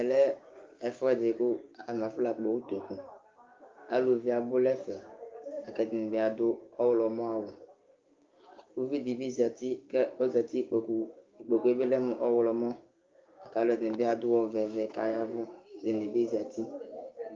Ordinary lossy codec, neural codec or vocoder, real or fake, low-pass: Opus, 32 kbps; codec, 16 kHz, 6 kbps, DAC; fake; 7.2 kHz